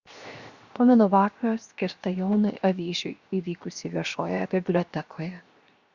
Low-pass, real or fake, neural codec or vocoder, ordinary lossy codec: 7.2 kHz; fake; codec, 16 kHz, 0.7 kbps, FocalCodec; Opus, 64 kbps